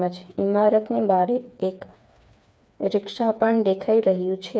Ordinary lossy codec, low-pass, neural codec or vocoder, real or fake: none; none; codec, 16 kHz, 4 kbps, FreqCodec, smaller model; fake